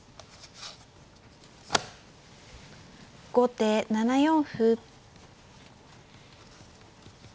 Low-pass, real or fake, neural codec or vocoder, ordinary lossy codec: none; real; none; none